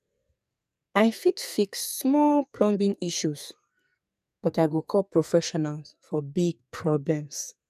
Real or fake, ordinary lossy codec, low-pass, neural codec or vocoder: fake; none; 14.4 kHz; codec, 44.1 kHz, 2.6 kbps, SNAC